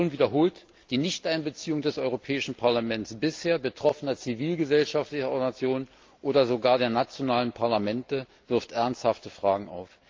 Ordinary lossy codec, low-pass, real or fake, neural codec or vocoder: Opus, 24 kbps; 7.2 kHz; real; none